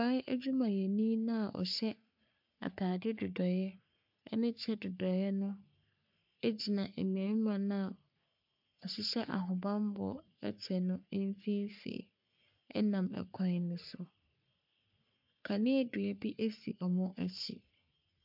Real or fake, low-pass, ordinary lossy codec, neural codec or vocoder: fake; 5.4 kHz; MP3, 48 kbps; codec, 44.1 kHz, 3.4 kbps, Pupu-Codec